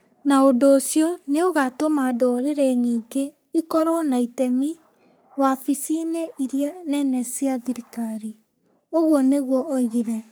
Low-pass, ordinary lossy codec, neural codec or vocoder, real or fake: none; none; codec, 44.1 kHz, 3.4 kbps, Pupu-Codec; fake